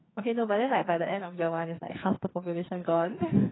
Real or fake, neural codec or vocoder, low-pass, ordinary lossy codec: fake; codec, 44.1 kHz, 2.6 kbps, SNAC; 7.2 kHz; AAC, 16 kbps